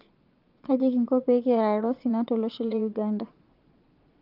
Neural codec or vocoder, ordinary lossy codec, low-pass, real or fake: vocoder, 22.05 kHz, 80 mel bands, Vocos; Opus, 24 kbps; 5.4 kHz; fake